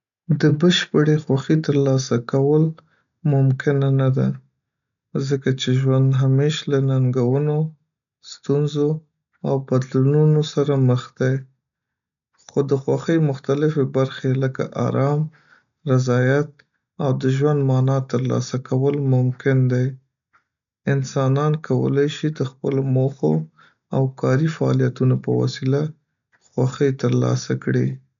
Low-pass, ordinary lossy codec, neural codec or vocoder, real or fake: 7.2 kHz; none; none; real